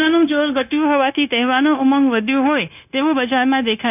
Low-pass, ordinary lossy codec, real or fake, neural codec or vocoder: 3.6 kHz; none; fake; codec, 16 kHz, 0.9 kbps, LongCat-Audio-Codec